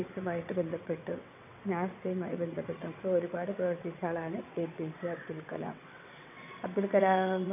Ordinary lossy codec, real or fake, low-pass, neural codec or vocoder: none; fake; 3.6 kHz; vocoder, 22.05 kHz, 80 mel bands, WaveNeXt